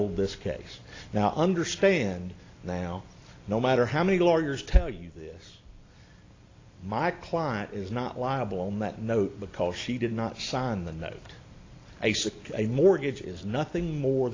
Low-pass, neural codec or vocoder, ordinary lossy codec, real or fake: 7.2 kHz; none; AAC, 32 kbps; real